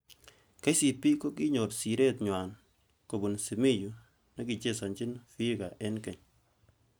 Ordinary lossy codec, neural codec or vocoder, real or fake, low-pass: none; none; real; none